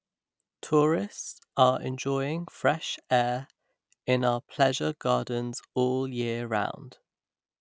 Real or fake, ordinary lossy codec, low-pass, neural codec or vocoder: real; none; none; none